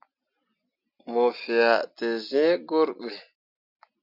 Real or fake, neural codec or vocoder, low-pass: real; none; 5.4 kHz